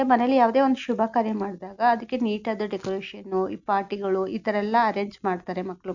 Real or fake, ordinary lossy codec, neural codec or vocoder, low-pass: real; none; none; 7.2 kHz